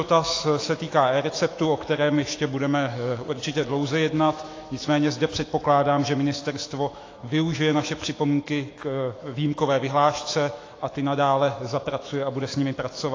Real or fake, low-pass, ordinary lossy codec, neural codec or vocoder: fake; 7.2 kHz; AAC, 32 kbps; autoencoder, 48 kHz, 128 numbers a frame, DAC-VAE, trained on Japanese speech